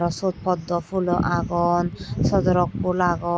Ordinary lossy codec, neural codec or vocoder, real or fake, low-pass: none; none; real; none